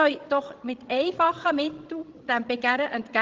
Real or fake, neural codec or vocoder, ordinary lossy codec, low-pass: fake; codec, 16 kHz, 16 kbps, FreqCodec, larger model; Opus, 16 kbps; 7.2 kHz